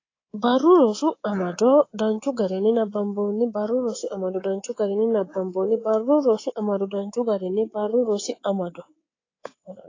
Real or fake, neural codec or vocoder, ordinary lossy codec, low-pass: fake; codec, 24 kHz, 3.1 kbps, DualCodec; AAC, 32 kbps; 7.2 kHz